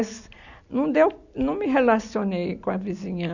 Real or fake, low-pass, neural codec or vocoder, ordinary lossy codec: real; 7.2 kHz; none; none